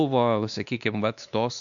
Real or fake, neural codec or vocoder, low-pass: fake; codec, 16 kHz, 2 kbps, X-Codec, WavLM features, trained on Multilingual LibriSpeech; 7.2 kHz